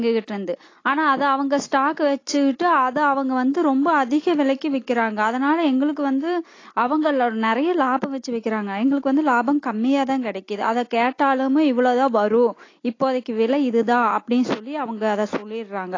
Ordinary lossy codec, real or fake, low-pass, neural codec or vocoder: AAC, 32 kbps; real; 7.2 kHz; none